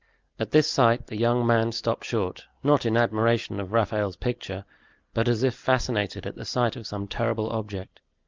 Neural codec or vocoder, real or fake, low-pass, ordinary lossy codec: none; real; 7.2 kHz; Opus, 24 kbps